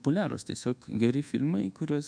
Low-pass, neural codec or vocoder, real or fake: 9.9 kHz; codec, 24 kHz, 1.2 kbps, DualCodec; fake